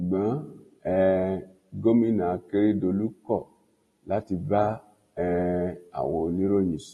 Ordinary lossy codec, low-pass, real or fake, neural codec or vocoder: AAC, 32 kbps; 19.8 kHz; real; none